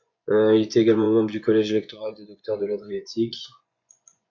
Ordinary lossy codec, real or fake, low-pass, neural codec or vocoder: MP3, 48 kbps; fake; 7.2 kHz; vocoder, 24 kHz, 100 mel bands, Vocos